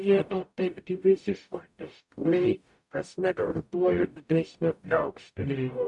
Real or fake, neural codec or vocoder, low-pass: fake; codec, 44.1 kHz, 0.9 kbps, DAC; 10.8 kHz